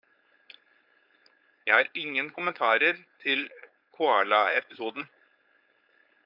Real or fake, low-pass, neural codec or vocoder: fake; 5.4 kHz; codec, 16 kHz, 4.8 kbps, FACodec